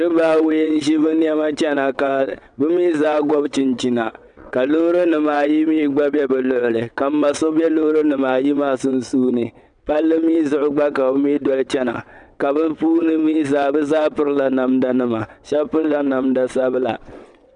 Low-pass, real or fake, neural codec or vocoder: 9.9 kHz; fake; vocoder, 22.05 kHz, 80 mel bands, WaveNeXt